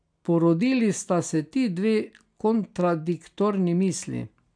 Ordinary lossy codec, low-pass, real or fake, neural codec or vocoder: none; 9.9 kHz; real; none